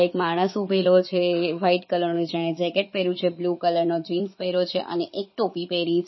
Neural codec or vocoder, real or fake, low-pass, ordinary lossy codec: vocoder, 44.1 kHz, 80 mel bands, Vocos; fake; 7.2 kHz; MP3, 24 kbps